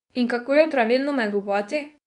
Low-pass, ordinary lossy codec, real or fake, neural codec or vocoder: 10.8 kHz; none; fake; codec, 24 kHz, 0.9 kbps, WavTokenizer, medium speech release version 2